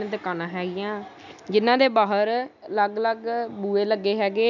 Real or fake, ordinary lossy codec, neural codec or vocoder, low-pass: real; none; none; 7.2 kHz